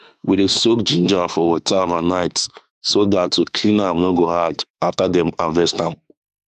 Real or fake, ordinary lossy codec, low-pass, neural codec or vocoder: fake; none; 14.4 kHz; autoencoder, 48 kHz, 32 numbers a frame, DAC-VAE, trained on Japanese speech